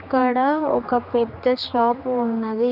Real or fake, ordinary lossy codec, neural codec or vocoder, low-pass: fake; none; codec, 16 kHz, 2 kbps, X-Codec, HuBERT features, trained on general audio; 5.4 kHz